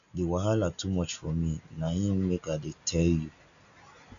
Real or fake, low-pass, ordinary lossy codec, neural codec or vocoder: real; 7.2 kHz; none; none